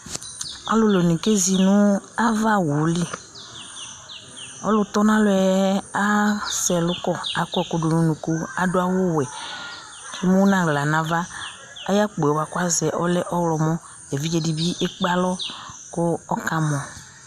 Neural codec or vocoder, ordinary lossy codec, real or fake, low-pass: none; MP3, 96 kbps; real; 14.4 kHz